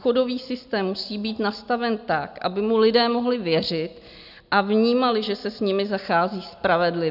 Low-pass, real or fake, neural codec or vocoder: 5.4 kHz; real; none